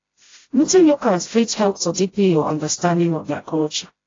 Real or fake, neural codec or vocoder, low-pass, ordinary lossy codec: fake; codec, 16 kHz, 0.5 kbps, FreqCodec, smaller model; 7.2 kHz; AAC, 32 kbps